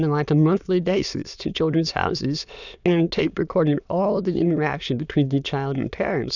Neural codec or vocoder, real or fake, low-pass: autoencoder, 22.05 kHz, a latent of 192 numbers a frame, VITS, trained on many speakers; fake; 7.2 kHz